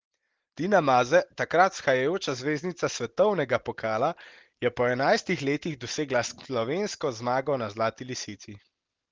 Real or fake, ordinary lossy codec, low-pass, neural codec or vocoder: real; Opus, 16 kbps; 7.2 kHz; none